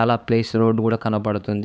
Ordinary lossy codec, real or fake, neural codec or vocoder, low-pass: none; fake; codec, 16 kHz, 4 kbps, X-Codec, HuBERT features, trained on LibriSpeech; none